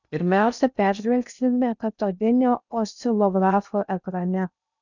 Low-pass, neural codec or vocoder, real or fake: 7.2 kHz; codec, 16 kHz in and 24 kHz out, 0.6 kbps, FocalCodec, streaming, 2048 codes; fake